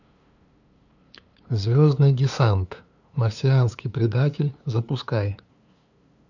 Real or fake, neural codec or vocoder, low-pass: fake; codec, 16 kHz, 2 kbps, FunCodec, trained on LibriTTS, 25 frames a second; 7.2 kHz